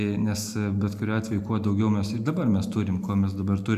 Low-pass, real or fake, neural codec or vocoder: 14.4 kHz; real; none